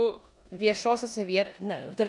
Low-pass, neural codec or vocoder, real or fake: 10.8 kHz; codec, 16 kHz in and 24 kHz out, 0.9 kbps, LongCat-Audio-Codec, four codebook decoder; fake